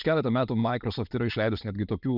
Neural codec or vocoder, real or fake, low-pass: none; real; 5.4 kHz